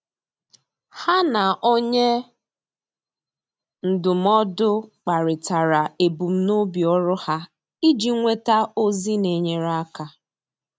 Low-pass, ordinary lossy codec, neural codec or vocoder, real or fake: none; none; none; real